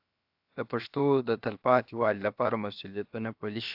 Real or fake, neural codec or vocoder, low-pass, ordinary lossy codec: fake; codec, 16 kHz, 0.7 kbps, FocalCodec; 5.4 kHz; AAC, 48 kbps